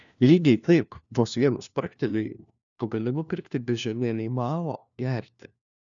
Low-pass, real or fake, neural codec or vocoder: 7.2 kHz; fake; codec, 16 kHz, 1 kbps, FunCodec, trained on LibriTTS, 50 frames a second